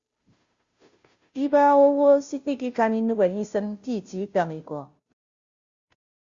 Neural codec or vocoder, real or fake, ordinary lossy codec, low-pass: codec, 16 kHz, 0.5 kbps, FunCodec, trained on Chinese and English, 25 frames a second; fake; Opus, 64 kbps; 7.2 kHz